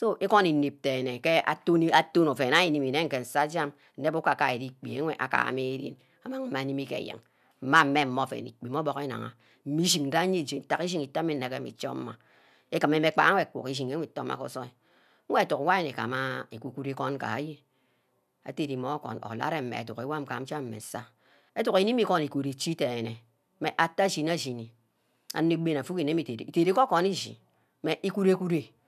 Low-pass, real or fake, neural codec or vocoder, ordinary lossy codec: 14.4 kHz; real; none; none